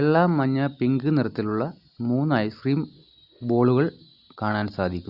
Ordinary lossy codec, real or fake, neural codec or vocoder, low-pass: Opus, 64 kbps; real; none; 5.4 kHz